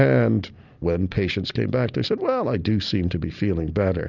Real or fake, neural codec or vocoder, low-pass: real; none; 7.2 kHz